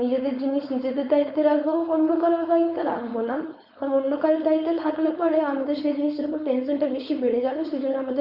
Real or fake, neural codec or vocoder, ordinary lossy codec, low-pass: fake; codec, 16 kHz, 4.8 kbps, FACodec; Opus, 64 kbps; 5.4 kHz